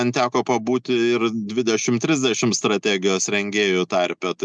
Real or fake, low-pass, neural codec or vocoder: real; 9.9 kHz; none